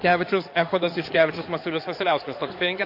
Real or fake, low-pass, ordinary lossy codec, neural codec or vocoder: fake; 5.4 kHz; MP3, 32 kbps; codec, 16 kHz in and 24 kHz out, 2.2 kbps, FireRedTTS-2 codec